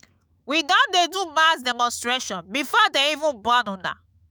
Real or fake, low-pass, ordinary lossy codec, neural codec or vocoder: fake; none; none; autoencoder, 48 kHz, 128 numbers a frame, DAC-VAE, trained on Japanese speech